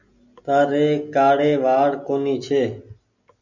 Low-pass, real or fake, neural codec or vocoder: 7.2 kHz; real; none